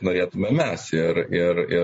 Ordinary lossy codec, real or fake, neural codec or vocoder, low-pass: MP3, 32 kbps; real; none; 10.8 kHz